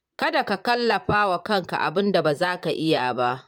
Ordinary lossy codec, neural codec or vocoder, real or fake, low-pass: none; vocoder, 44.1 kHz, 128 mel bands, Pupu-Vocoder; fake; 19.8 kHz